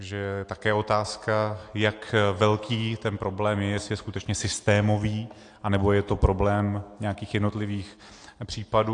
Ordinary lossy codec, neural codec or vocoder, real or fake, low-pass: AAC, 48 kbps; none; real; 9.9 kHz